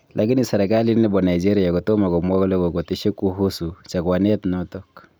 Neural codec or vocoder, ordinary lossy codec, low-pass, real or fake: none; none; none; real